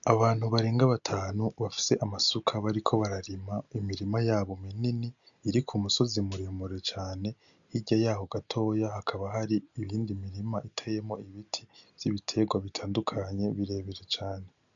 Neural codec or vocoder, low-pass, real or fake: none; 7.2 kHz; real